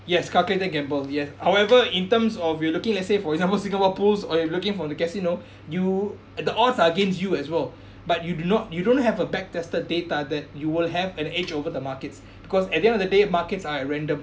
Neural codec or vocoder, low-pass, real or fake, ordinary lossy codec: none; none; real; none